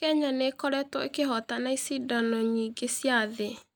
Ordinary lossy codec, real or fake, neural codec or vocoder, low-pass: none; real; none; none